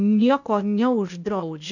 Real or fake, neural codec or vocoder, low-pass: fake; codec, 16 kHz, 0.8 kbps, ZipCodec; 7.2 kHz